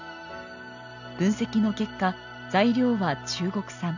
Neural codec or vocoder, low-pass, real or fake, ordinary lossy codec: none; 7.2 kHz; real; none